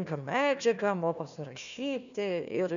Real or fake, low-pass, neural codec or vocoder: fake; 7.2 kHz; codec, 16 kHz, 1 kbps, FunCodec, trained on Chinese and English, 50 frames a second